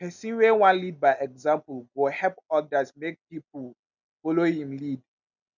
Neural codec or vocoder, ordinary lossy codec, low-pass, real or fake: none; none; 7.2 kHz; real